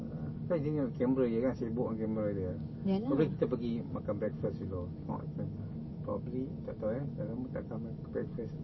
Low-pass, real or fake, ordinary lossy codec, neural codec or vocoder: 7.2 kHz; real; MP3, 24 kbps; none